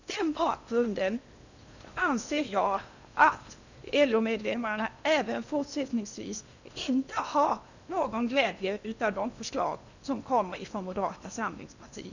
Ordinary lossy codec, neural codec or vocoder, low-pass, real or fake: none; codec, 16 kHz in and 24 kHz out, 0.8 kbps, FocalCodec, streaming, 65536 codes; 7.2 kHz; fake